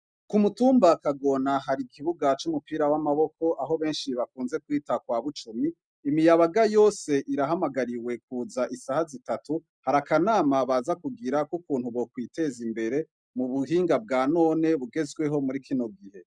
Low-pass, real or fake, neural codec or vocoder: 9.9 kHz; real; none